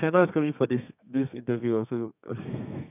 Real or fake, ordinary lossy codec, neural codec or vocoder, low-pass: fake; none; codec, 32 kHz, 1.9 kbps, SNAC; 3.6 kHz